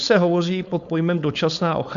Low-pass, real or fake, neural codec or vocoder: 7.2 kHz; fake; codec, 16 kHz, 4.8 kbps, FACodec